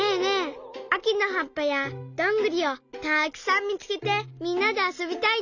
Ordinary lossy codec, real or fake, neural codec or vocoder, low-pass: none; real; none; 7.2 kHz